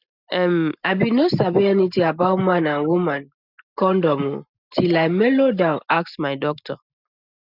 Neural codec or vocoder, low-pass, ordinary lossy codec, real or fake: none; 5.4 kHz; none; real